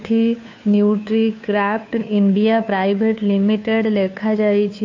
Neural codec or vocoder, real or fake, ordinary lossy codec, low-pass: codec, 16 kHz, 2 kbps, FunCodec, trained on Chinese and English, 25 frames a second; fake; AAC, 48 kbps; 7.2 kHz